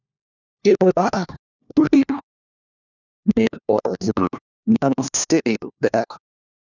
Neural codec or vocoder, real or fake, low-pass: codec, 16 kHz, 1 kbps, FunCodec, trained on LibriTTS, 50 frames a second; fake; 7.2 kHz